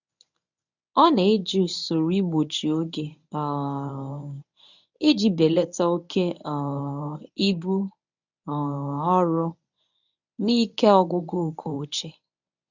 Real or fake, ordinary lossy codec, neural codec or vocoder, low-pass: fake; none; codec, 24 kHz, 0.9 kbps, WavTokenizer, medium speech release version 1; 7.2 kHz